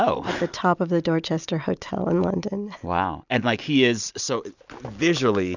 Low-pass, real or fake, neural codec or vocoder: 7.2 kHz; real; none